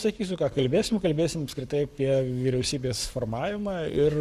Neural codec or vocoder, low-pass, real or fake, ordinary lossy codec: codec, 44.1 kHz, 7.8 kbps, Pupu-Codec; 14.4 kHz; fake; Opus, 64 kbps